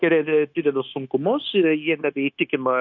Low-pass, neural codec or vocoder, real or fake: 7.2 kHz; codec, 16 kHz, 0.9 kbps, LongCat-Audio-Codec; fake